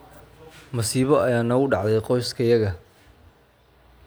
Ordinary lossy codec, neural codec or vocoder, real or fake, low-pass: none; none; real; none